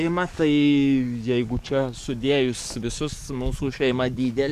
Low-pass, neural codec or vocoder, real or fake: 14.4 kHz; codec, 44.1 kHz, 7.8 kbps, DAC; fake